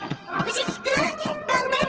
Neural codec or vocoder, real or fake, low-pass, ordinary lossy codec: vocoder, 22.05 kHz, 80 mel bands, HiFi-GAN; fake; 7.2 kHz; Opus, 16 kbps